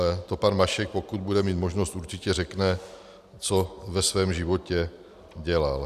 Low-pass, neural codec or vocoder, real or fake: 14.4 kHz; none; real